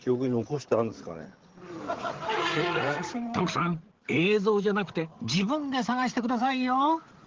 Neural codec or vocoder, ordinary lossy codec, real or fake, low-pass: codec, 16 kHz, 8 kbps, FreqCodec, larger model; Opus, 16 kbps; fake; 7.2 kHz